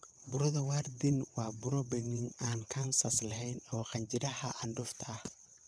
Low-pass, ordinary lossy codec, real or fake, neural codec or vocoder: none; none; fake; vocoder, 22.05 kHz, 80 mel bands, WaveNeXt